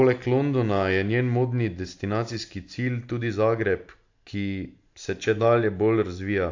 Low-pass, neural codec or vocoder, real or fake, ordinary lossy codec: 7.2 kHz; none; real; AAC, 48 kbps